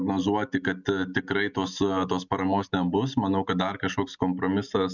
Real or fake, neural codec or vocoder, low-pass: real; none; 7.2 kHz